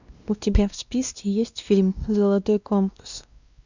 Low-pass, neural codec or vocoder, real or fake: 7.2 kHz; codec, 16 kHz, 1 kbps, X-Codec, WavLM features, trained on Multilingual LibriSpeech; fake